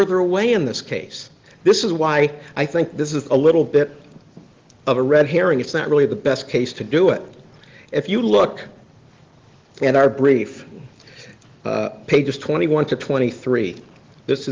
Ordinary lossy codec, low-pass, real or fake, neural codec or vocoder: Opus, 16 kbps; 7.2 kHz; real; none